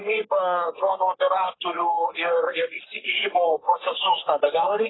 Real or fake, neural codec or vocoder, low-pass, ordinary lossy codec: fake; codec, 44.1 kHz, 3.4 kbps, Pupu-Codec; 7.2 kHz; AAC, 16 kbps